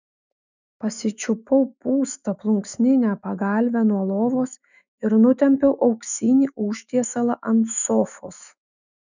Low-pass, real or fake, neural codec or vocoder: 7.2 kHz; fake; autoencoder, 48 kHz, 128 numbers a frame, DAC-VAE, trained on Japanese speech